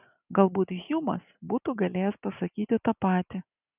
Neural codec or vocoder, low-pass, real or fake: none; 3.6 kHz; real